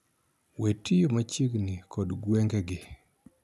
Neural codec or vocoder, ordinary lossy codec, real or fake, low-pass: none; none; real; none